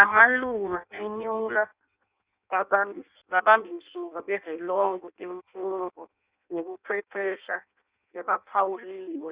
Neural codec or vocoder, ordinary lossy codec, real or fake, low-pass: codec, 16 kHz in and 24 kHz out, 0.6 kbps, FireRedTTS-2 codec; Opus, 64 kbps; fake; 3.6 kHz